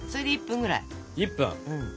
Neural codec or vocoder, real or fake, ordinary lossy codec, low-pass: none; real; none; none